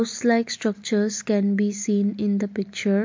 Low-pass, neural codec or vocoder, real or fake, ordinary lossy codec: 7.2 kHz; none; real; MP3, 48 kbps